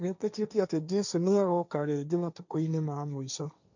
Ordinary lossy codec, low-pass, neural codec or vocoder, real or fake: none; 7.2 kHz; codec, 16 kHz, 1.1 kbps, Voila-Tokenizer; fake